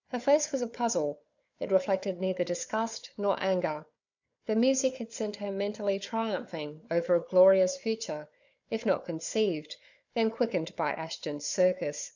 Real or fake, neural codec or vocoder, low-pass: fake; codec, 16 kHz, 4 kbps, FunCodec, trained on Chinese and English, 50 frames a second; 7.2 kHz